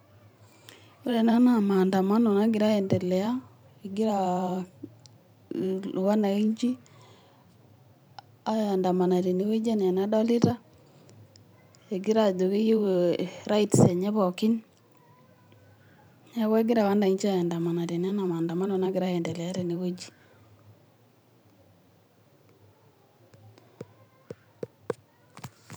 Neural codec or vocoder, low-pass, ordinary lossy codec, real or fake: vocoder, 44.1 kHz, 128 mel bands every 512 samples, BigVGAN v2; none; none; fake